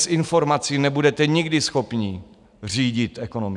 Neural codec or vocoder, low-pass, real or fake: none; 10.8 kHz; real